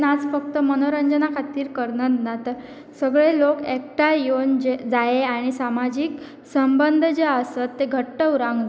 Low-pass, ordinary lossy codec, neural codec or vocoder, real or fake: none; none; none; real